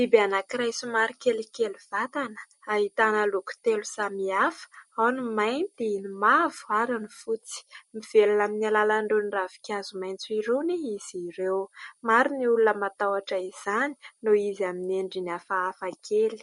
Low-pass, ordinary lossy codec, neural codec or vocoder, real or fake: 19.8 kHz; MP3, 48 kbps; none; real